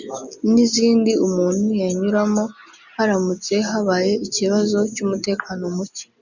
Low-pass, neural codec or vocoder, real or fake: 7.2 kHz; none; real